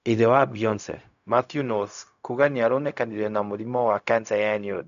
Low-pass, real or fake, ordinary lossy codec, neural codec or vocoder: 7.2 kHz; fake; none; codec, 16 kHz, 0.4 kbps, LongCat-Audio-Codec